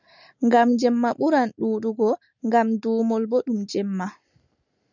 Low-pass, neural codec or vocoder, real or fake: 7.2 kHz; none; real